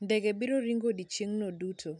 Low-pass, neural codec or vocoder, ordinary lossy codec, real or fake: 10.8 kHz; none; none; real